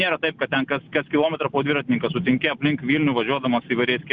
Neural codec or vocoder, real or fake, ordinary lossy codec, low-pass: none; real; Opus, 64 kbps; 7.2 kHz